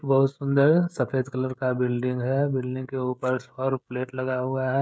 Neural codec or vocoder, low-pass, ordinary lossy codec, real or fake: codec, 16 kHz, 16 kbps, FreqCodec, smaller model; none; none; fake